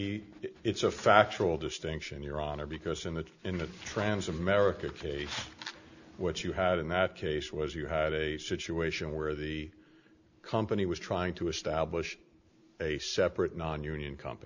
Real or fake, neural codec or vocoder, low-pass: real; none; 7.2 kHz